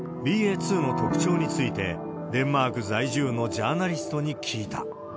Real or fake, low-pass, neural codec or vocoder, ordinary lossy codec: real; none; none; none